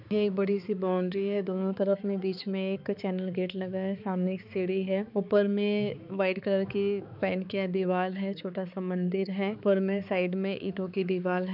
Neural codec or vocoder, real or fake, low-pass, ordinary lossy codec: codec, 16 kHz, 4 kbps, X-Codec, HuBERT features, trained on balanced general audio; fake; 5.4 kHz; none